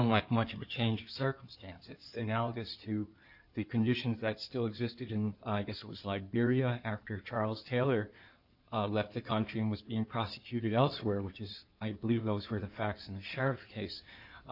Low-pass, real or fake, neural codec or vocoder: 5.4 kHz; fake; codec, 16 kHz in and 24 kHz out, 2.2 kbps, FireRedTTS-2 codec